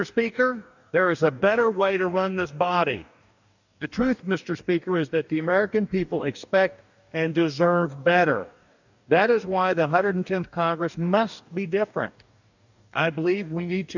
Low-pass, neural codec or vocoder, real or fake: 7.2 kHz; codec, 44.1 kHz, 2.6 kbps, DAC; fake